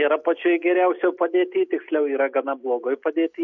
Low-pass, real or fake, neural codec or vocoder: 7.2 kHz; real; none